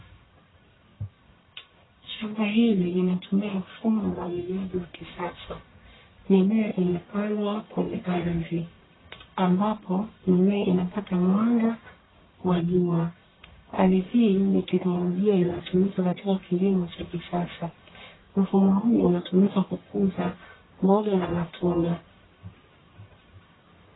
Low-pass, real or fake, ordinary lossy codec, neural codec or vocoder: 7.2 kHz; fake; AAC, 16 kbps; codec, 44.1 kHz, 1.7 kbps, Pupu-Codec